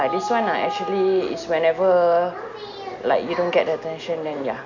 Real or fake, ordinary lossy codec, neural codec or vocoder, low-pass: real; none; none; 7.2 kHz